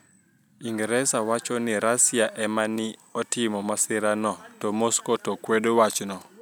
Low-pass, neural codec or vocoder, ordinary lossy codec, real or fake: none; none; none; real